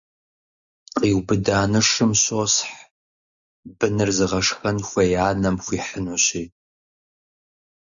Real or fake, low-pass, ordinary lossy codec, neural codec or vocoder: real; 7.2 kHz; MP3, 96 kbps; none